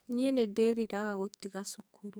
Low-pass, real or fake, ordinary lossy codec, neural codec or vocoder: none; fake; none; codec, 44.1 kHz, 2.6 kbps, SNAC